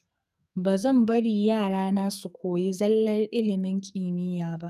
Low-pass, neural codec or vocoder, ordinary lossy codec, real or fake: 14.4 kHz; codec, 44.1 kHz, 2.6 kbps, SNAC; none; fake